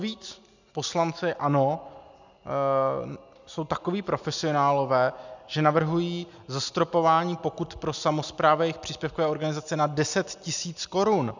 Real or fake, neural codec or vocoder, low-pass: real; none; 7.2 kHz